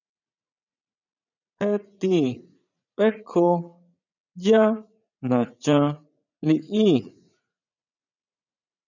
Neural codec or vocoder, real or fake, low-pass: vocoder, 44.1 kHz, 80 mel bands, Vocos; fake; 7.2 kHz